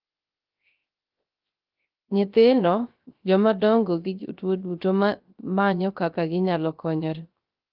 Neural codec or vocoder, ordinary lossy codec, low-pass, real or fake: codec, 16 kHz, 0.3 kbps, FocalCodec; Opus, 32 kbps; 5.4 kHz; fake